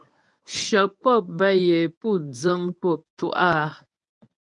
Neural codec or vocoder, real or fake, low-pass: codec, 24 kHz, 0.9 kbps, WavTokenizer, medium speech release version 1; fake; 10.8 kHz